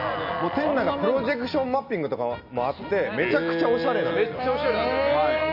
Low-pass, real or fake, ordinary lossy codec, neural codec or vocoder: 5.4 kHz; real; none; none